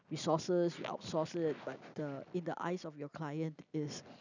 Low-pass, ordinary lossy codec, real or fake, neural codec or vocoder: 7.2 kHz; none; real; none